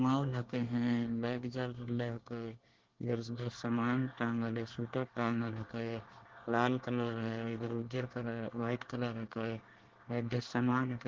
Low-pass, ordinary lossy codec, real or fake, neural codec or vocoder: 7.2 kHz; Opus, 16 kbps; fake; codec, 24 kHz, 1 kbps, SNAC